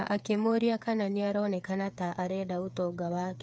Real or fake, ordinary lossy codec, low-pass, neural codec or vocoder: fake; none; none; codec, 16 kHz, 8 kbps, FreqCodec, smaller model